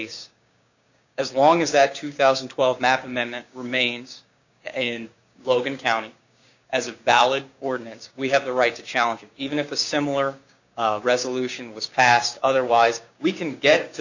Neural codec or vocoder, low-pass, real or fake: codec, 16 kHz, 6 kbps, DAC; 7.2 kHz; fake